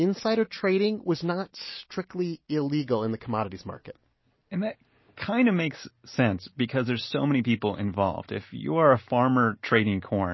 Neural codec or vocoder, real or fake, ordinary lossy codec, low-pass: none; real; MP3, 24 kbps; 7.2 kHz